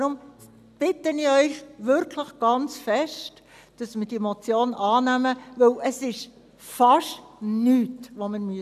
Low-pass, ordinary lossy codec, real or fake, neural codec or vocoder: 14.4 kHz; none; real; none